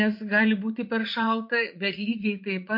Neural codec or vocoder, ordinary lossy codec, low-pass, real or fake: vocoder, 44.1 kHz, 80 mel bands, Vocos; MP3, 32 kbps; 5.4 kHz; fake